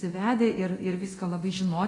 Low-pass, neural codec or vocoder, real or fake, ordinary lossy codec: 10.8 kHz; codec, 24 kHz, 0.9 kbps, DualCodec; fake; AAC, 32 kbps